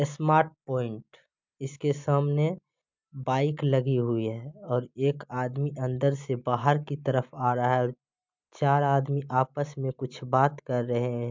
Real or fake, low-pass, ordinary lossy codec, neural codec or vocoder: real; 7.2 kHz; MP3, 64 kbps; none